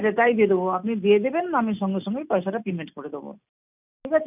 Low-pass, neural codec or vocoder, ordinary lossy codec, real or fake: 3.6 kHz; none; none; real